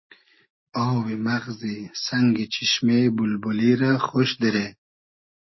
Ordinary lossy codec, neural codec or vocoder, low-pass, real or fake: MP3, 24 kbps; none; 7.2 kHz; real